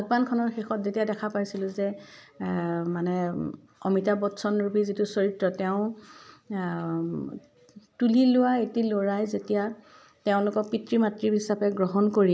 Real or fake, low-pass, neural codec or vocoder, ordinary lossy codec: real; none; none; none